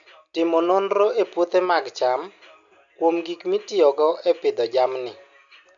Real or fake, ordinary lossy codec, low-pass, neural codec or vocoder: real; none; 7.2 kHz; none